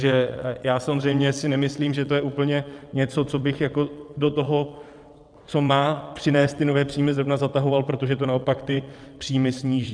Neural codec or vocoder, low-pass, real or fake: vocoder, 22.05 kHz, 80 mel bands, WaveNeXt; 9.9 kHz; fake